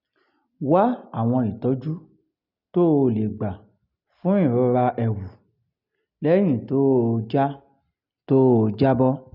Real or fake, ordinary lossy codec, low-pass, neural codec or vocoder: real; none; 5.4 kHz; none